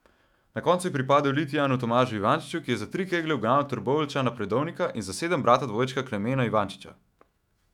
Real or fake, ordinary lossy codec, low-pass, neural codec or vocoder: fake; none; 19.8 kHz; autoencoder, 48 kHz, 128 numbers a frame, DAC-VAE, trained on Japanese speech